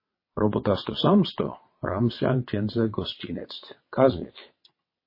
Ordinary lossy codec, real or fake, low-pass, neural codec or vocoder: MP3, 24 kbps; fake; 5.4 kHz; vocoder, 22.05 kHz, 80 mel bands, WaveNeXt